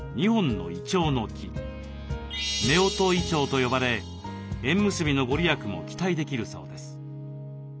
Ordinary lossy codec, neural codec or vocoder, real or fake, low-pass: none; none; real; none